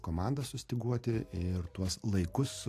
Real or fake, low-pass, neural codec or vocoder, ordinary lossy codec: real; 14.4 kHz; none; AAC, 64 kbps